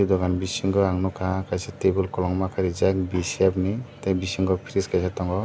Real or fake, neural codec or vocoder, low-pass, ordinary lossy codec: real; none; none; none